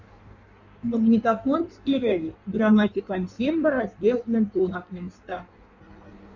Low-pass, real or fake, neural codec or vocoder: 7.2 kHz; fake; codec, 16 kHz in and 24 kHz out, 1.1 kbps, FireRedTTS-2 codec